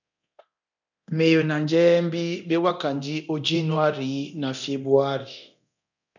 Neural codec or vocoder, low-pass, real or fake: codec, 24 kHz, 0.9 kbps, DualCodec; 7.2 kHz; fake